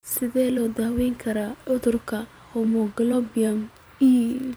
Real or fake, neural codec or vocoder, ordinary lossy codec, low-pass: fake; vocoder, 44.1 kHz, 128 mel bands every 512 samples, BigVGAN v2; none; none